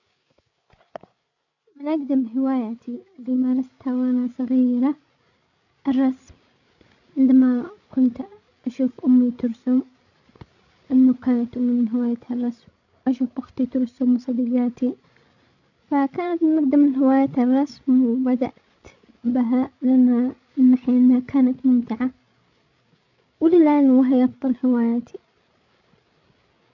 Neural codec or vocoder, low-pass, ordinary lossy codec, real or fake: codec, 16 kHz, 16 kbps, FreqCodec, larger model; 7.2 kHz; none; fake